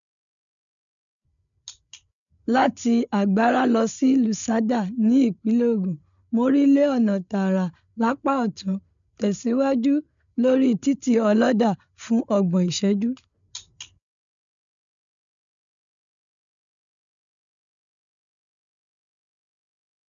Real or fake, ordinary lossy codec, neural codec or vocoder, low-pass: fake; none; codec, 16 kHz, 8 kbps, FreqCodec, larger model; 7.2 kHz